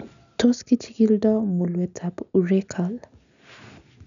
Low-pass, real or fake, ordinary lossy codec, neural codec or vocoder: 7.2 kHz; real; none; none